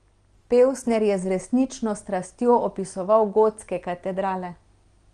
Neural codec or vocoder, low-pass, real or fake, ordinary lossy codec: none; 9.9 kHz; real; Opus, 24 kbps